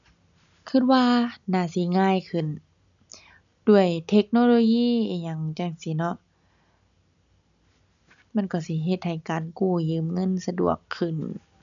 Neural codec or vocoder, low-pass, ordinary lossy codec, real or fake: none; 7.2 kHz; none; real